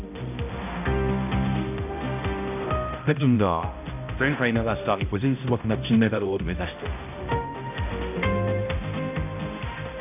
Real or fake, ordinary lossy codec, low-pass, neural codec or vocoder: fake; none; 3.6 kHz; codec, 16 kHz, 0.5 kbps, X-Codec, HuBERT features, trained on balanced general audio